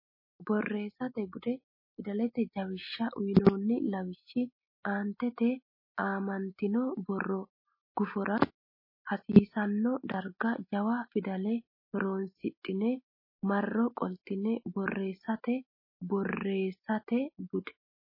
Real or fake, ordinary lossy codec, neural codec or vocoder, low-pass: real; MP3, 24 kbps; none; 5.4 kHz